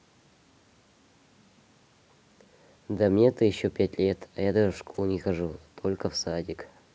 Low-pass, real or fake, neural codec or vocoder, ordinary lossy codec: none; real; none; none